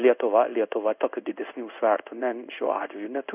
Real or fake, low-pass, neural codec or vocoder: fake; 3.6 kHz; codec, 16 kHz in and 24 kHz out, 1 kbps, XY-Tokenizer